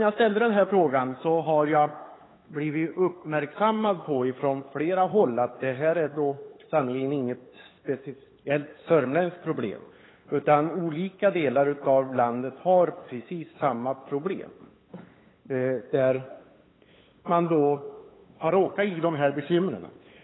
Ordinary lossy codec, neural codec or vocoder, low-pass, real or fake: AAC, 16 kbps; codec, 16 kHz, 8 kbps, FunCodec, trained on LibriTTS, 25 frames a second; 7.2 kHz; fake